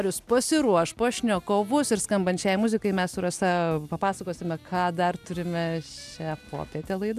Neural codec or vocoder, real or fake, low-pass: none; real; 14.4 kHz